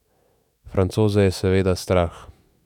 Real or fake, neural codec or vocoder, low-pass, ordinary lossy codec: fake; autoencoder, 48 kHz, 128 numbers a frame, DAC-VAE, trained on Japanese speech; 19.8 kHz; none